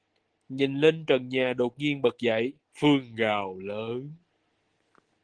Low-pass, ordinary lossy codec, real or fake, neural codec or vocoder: 9.9 kHz; Opus, 16 kbps; real; none